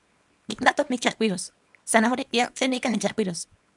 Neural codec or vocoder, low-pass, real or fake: codec, 24 kHz, 0.9 kbps, WavTokenizer, small release; 10.8 kHz; fake